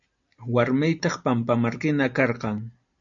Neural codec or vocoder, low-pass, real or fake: none; 7.2 kHz; real